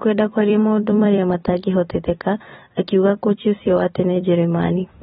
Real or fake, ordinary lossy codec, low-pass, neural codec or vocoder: fake; AAC, 16 kbps; 19.8 kHz; vocoder, 44.1 kHz, 128 mel bands every 256 samples, BigVGAN v2